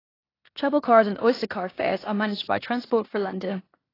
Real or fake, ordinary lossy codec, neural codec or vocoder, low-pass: fake; AAC, 24 kbps; codec, 16 kHz in and 24 kHz out, 0.9 kbps, LongCat-Audio-Codec, four codebook decoder; 5.4 kHz